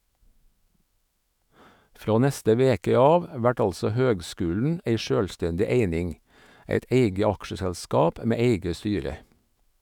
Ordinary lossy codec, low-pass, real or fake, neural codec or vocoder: none; 19.8 kHz; fake; autoencoder, 48 kHz, 128 numbers a frame, DAC-VAE, trained on Japanese speech